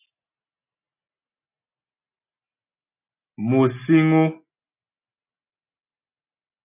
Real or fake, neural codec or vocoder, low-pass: real; none; 3.6 kHz